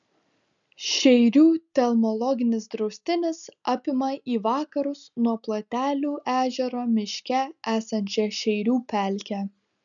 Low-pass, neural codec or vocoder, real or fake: 7.2 kHz; none; real